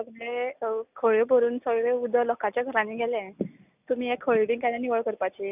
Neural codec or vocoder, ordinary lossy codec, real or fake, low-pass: none; none; real; 3.6 kHz